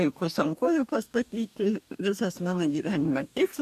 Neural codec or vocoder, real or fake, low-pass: codec, 44.1 kHz, 2.6 kbps, DAC; fake; 14.4 kHz